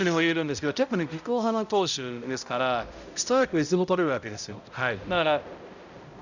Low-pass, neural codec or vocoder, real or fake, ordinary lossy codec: 7.2 kHz; codec, 16 kHz, 0.5 kbps, X-Codec, HuBERT features, trained on balanced general audio; fake; none